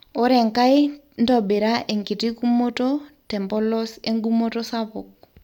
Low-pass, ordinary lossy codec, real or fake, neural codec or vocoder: 19.8 kHz; none; real; none